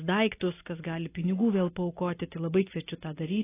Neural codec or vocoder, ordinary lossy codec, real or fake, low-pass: none; AAC, 16 kbps; real; 3.6 kHz